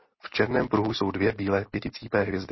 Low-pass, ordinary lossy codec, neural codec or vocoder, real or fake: 7.2 kHz; MP3, 24 kbps; none; real